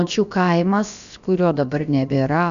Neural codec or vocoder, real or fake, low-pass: codec, 16 kHz, about 1 kbps, DyCAST, with the encoder's durations; fake; 7.2 kHz